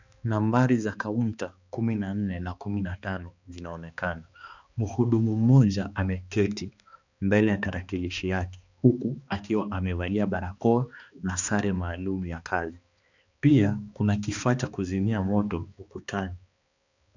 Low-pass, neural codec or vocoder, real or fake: 7.2 kHz; codec, 16 kHz, 2 kbps, X-Codec, HuBERT features, trained on balanced general audio; fake